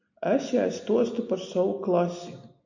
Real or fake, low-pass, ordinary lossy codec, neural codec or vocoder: real; 7.2 kHz; AAC, 48 kbps; none